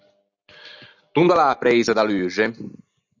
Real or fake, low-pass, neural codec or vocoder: real; 7.2 kHz; none